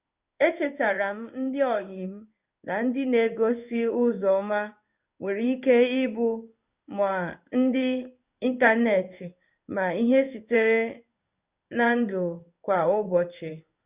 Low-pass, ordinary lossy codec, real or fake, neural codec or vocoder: 3.6 kHz; Opus, 64 kbps; fake; codec, 16 kHz in and 24 kHz out, 1 kbps, XY-Tokenizer